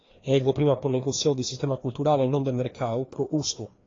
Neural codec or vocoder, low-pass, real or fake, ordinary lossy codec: codec, 16 kHz, 2 kbps, FreqCodec, larger model; 7.2 kHz; fake; AAC, 32 kbps